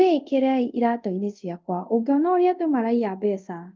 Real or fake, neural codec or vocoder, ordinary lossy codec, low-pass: fake; codec, 24 kHz, 0.5 kbps, DualCodec; Opus, 24 kbps; 7.2 kHz